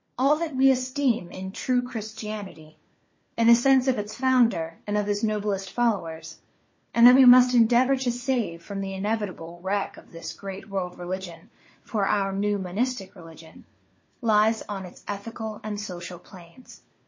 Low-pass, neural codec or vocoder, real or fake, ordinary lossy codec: 7.2 kHz; codec, 16 kHz, 4 kbps, FunCodec, trained on LibriTTS, 50 frames a second; fake; MP3, 32 kbps